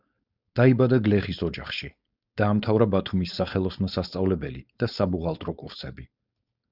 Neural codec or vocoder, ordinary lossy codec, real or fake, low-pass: codec, 16 kHz, 4.8 kbps, FACodec; Opus, 64 kbps; fake; 5.4 kHz